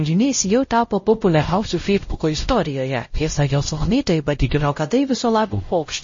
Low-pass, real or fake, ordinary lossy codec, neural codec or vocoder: 7.2 kHz; fake; MP3, 32 kbps; codec, 16 kHz, 0.5 kbps, X-Codec, WavLM features, trained on Multilingual LibriSpeech